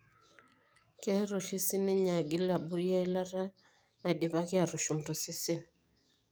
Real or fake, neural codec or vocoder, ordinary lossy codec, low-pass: fake; codec, 44.1 kHz, 7.8 kbps, DAC; none; none